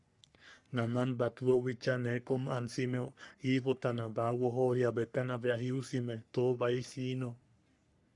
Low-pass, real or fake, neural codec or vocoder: 10.8 kHz; fake; codec, 44.1 kHz, 3.4 kbps, Pupu-Codec